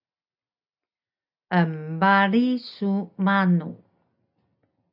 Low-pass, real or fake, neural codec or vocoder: 5.4 kHz; real; none